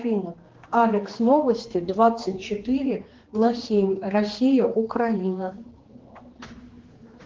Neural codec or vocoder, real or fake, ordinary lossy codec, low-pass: codec, 16 kHz, 2 kbps, X-Codec, HuBERT features, trained on general audio; fake; Opus, 16 kbps; 7.2 kHz